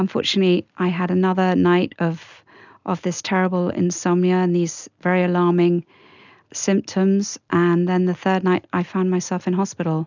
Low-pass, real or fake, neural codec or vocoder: 7.2 kHz; real; none